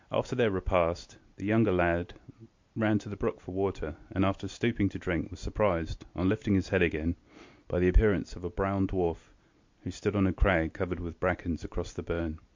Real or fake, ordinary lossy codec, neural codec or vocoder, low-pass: real; MP3, 48 kbps; none; 7.2 kHz